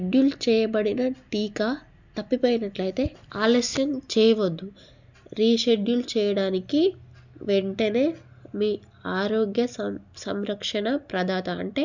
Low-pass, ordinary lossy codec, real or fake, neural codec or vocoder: 7.2 kHz; none; real; none